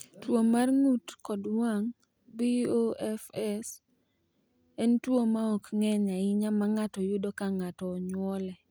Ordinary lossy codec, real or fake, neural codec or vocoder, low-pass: none; real; none; none